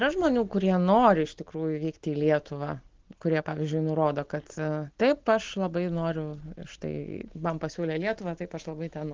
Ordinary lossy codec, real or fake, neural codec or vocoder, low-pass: Opus, 16 kbps; real; none; 7.2 kHz